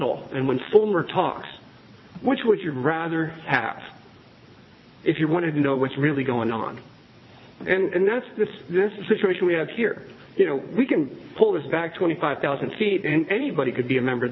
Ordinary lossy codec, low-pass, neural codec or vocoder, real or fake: MP3, 24 kbps; 7.2 kHz; vocoder, 22.05 kHz, 80 mel bands, WaveNeXt; fake